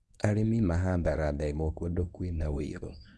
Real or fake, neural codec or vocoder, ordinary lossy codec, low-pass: fake; codec, 24 kHz, 0.9 kbps, WavTokenizer, medium speech release version 1; none; none